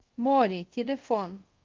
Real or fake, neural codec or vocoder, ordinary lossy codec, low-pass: fake; codec, 24 kHz, 0.5 kbps, DualCodec; Opus, 24 kbps; 7.2 kHz